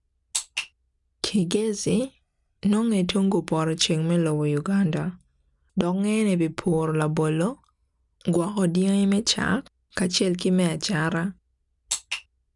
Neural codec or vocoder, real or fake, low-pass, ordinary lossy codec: none; real; 10.8 kHz; none